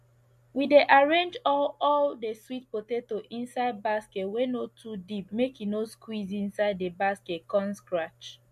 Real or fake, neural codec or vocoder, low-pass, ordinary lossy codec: real; none; 14.4 kHz; MP3, 64 kbps